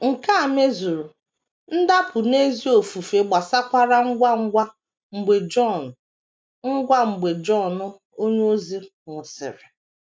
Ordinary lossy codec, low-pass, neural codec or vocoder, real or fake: none; none; none; real